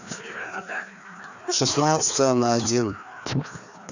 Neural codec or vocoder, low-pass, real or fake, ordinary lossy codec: codec, 16 kHz, 2 kbps, FreqCodec, larger model; 7.2 kHz; fake; none